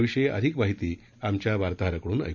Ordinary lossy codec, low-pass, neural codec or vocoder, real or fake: none; 7.2 kHz; none; real